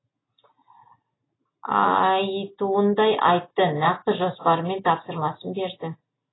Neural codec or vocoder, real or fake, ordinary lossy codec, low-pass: none; real; AAC, 16 kbps; 7.2 kHz